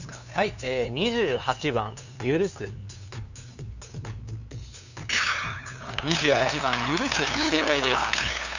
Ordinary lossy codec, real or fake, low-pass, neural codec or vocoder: none; fake; 7.2 kHz; codec, 16 kHz, 2 kbps, FunCodec, trained on LibriTTS, 25 frames a second